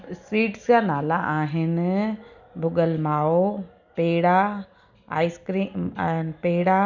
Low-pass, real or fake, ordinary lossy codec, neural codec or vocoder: 7.2 kHz; real; none; none